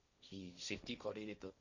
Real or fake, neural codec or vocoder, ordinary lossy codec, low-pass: fake; codec, 16 kHz, 1.1 kbps, Voila-Tokenizer; none; 7.2 kHz